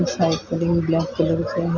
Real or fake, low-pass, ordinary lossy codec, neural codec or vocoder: real; 7.2 kHz; Opus, 64 kbps; none